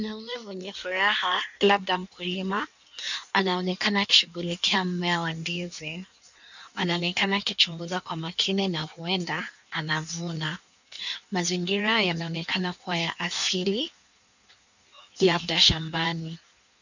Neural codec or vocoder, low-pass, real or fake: codec, 16 kHz in and 24 kHz out, 1.1 kbps, FireRedTTS-2 codec; 7.2 kHz; fake